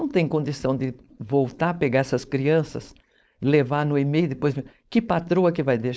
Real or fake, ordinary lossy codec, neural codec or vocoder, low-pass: fake; none; codec, 16 kHz, 4.8 kbps, FACodec; none